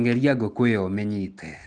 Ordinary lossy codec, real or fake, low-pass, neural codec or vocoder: Opus, 24 kbps; real; 9.9 kHz; none